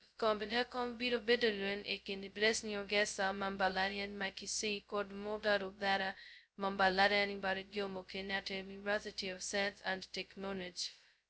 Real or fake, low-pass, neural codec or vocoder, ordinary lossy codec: fake; none; codec, 16 kHz, 0.2 kbps, FocalCodec; none